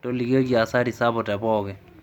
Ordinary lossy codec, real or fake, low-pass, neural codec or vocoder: MP3, 96 kbps; real; 19.8 kHz; none